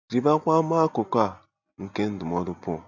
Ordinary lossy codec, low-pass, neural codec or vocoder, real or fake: none; 7.2 kHz; none; real